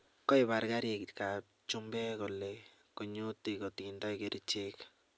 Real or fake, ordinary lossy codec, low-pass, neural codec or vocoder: real; none; none; none